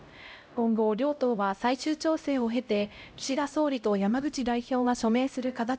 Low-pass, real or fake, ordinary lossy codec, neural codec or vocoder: none; fake; none; codec, 16 kHz, 0.5 kbps, X-Codec, HuBERT features, trained on LibriSpeech